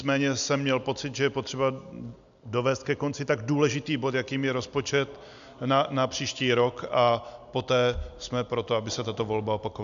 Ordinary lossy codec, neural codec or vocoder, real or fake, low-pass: AAC, 96 kbps; none; real; 7.2 kHz